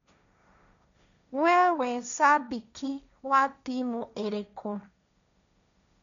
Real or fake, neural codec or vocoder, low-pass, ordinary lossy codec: fake; codec, 16 kHz, 1.1 kbps, Voila-Tokenizer; 7.2 kHz; none